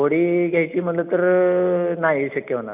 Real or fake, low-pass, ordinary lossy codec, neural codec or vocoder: real; 3.6 kHz; none; none